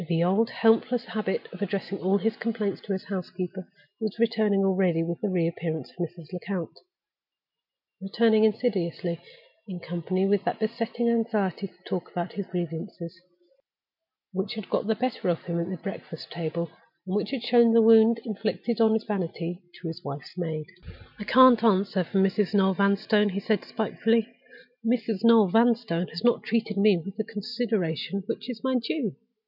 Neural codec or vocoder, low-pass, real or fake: none; 5.4 kHz; real